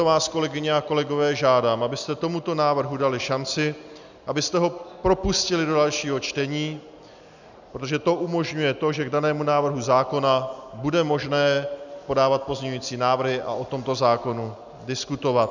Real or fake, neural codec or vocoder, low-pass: real; none; 7.2 kHz